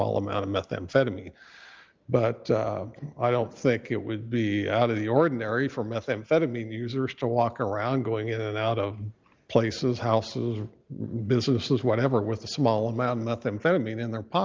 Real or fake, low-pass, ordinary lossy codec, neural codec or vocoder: real; 7.2 kHz; Opus, 24 kbps; none